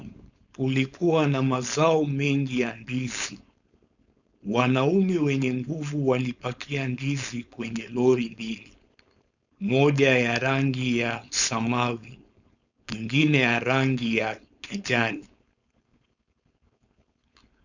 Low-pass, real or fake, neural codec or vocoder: 7.2 kHz; fake; codec, 16 kHz, 4.8 kbps, FACodec